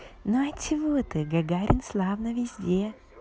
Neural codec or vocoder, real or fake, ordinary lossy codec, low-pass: none; real; none; none